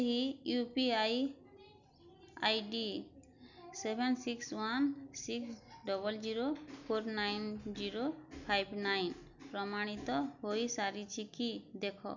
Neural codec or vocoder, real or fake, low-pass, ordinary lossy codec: none; real; 7.2 kHz; none